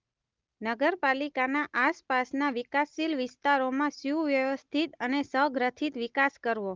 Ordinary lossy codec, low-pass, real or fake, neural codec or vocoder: Opus, 32 kbps; 7.2 kHz; real; none